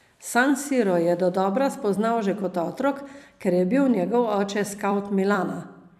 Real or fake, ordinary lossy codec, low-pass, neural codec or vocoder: fake; none; 14.4 kHz; vocoder, 44.1 kHz, 128 mel bands every 256 samples, BigVGAN v2